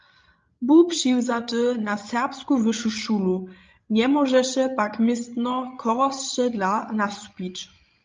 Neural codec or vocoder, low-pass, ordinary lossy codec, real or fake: codec, 16 kHz, 16 kbps, FreqCodec, larger model; 7.2 kHz; Opus, 24 kbps; fake